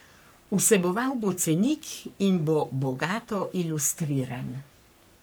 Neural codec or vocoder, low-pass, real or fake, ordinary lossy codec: codec, 44.1 kHz, 3.4 kbps, Pupu-Codec; none; fake; none